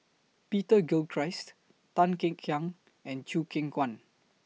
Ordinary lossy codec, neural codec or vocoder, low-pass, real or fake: none; none; none; real